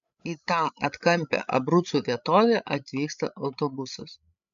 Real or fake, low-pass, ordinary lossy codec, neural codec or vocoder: fake; 7.2 kHz; MP3, 64 kbps; codec, 16 kHz, 16 kbps, FreqCodec, larger model